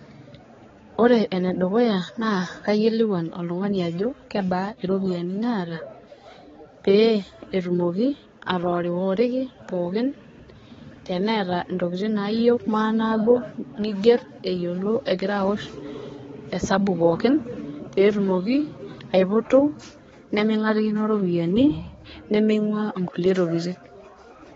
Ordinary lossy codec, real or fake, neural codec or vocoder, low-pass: AAC, 24 kbps; fake; codec, 16 kHz, 4 kbps, X-Codec, HuBERT features, trained on balanced general audio; 7.2 kHz